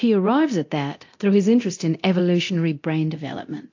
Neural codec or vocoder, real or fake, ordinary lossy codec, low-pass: codec, 24 kHz, 0.9 kbps, DualCodec; fake; AAC, 48 kbps; 7.2 kHz